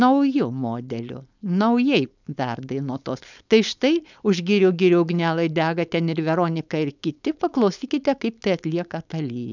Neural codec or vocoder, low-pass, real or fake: codec, 16 kHz, 4.8 kbps, FACodec; 7.2 kHz; fake